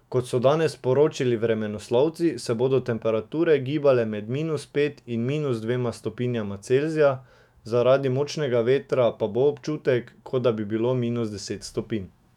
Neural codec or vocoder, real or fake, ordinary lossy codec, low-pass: autoencoder, 48 kHz, 128 numbers a frame, DAC-VAE, trained on Japanese speech; fake; none; 19.8 kHz